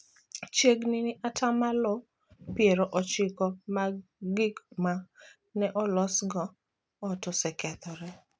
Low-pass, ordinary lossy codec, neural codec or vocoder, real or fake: none; none; none; real